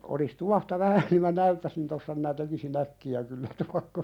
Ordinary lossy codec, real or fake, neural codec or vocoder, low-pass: none; real; none; 19.8 kHz